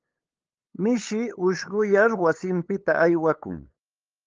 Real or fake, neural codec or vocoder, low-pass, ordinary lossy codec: fake; codec, 16 kHz, 8 kbps, FunCodec, trained on LibriTTS, 25 frames a second; 7.2 kHz; Opus, 24 kbps